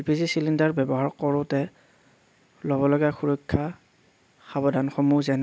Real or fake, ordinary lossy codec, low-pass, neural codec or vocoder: real; none; none; none